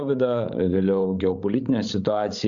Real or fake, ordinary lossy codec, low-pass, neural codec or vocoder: fake; Opus, 64 kbps; 7.2 kHz; codec, 16 kHz, 16 kbps, FreqCodec, larger model